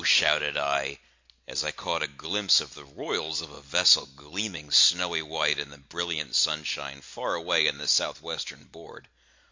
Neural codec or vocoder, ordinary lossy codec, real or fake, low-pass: none; MP3, 48 kbps; real; 7.2 kHz